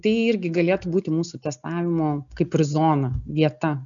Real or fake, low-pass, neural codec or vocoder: real; 7.2 kHz; none